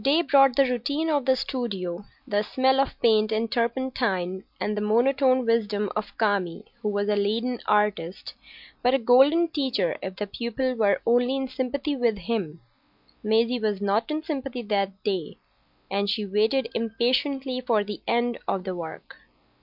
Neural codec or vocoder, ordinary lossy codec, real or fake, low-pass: none; MP3, 48 kbps; real; 5.4 kHz